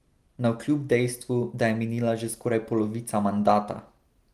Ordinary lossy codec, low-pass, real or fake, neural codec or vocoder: Opus, 24 kbps; 14.4 kHz; real; none